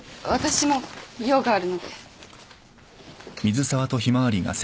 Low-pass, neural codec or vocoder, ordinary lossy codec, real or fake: none; none; none; real